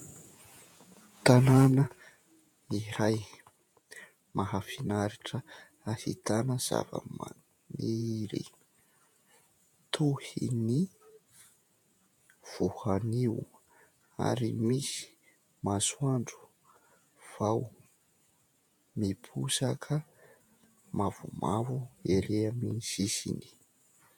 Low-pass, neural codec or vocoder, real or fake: 19.8 kHz; vocoder, 48 kHz, 128 mel bands, Vocos; fake